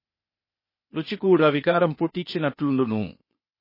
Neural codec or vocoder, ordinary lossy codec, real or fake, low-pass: codec, 16 kHz, 0.8 kbps, ZipCodec; MP3, 24 kbps; fake; 5.4 kHz